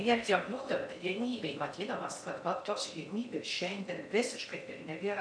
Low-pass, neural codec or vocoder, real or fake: 9.9 kHz; codec, 16 kHz in and 24 kHz out, 0.6 kbps, FocalCodec, streaming, 4096 codes; fake